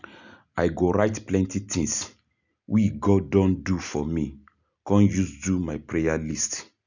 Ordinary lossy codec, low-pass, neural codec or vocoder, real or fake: none; 7.2 kHz; none; real